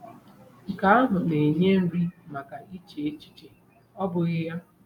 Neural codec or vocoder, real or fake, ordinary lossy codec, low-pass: vocoder, 44.1 kHz, 128 mel bands every 256 samples, BigVGAN v2; fake; none; 19.8 kHz